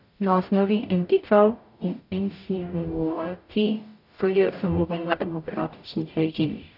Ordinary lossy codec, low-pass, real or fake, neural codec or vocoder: none; 5.4 kHz; fake; codec, 44.1 kHz, 0.9 kbps, DAC